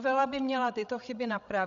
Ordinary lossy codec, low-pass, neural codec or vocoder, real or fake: Opus, 64 kbps; 7.2 kHz; codec, 16 kHz, 16 kbps, FreqCodec, larger model; fake